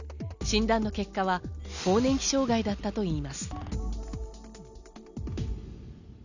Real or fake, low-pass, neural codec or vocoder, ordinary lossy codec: real; 7.2 kHz; none; none